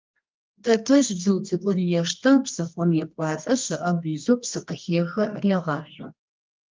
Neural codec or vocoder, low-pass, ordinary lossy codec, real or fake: codec, 24 kHz, 0.9 kbps, WavTokenizer, medium music audio release; 7.2 kHz; Opus, 32 kbps; fake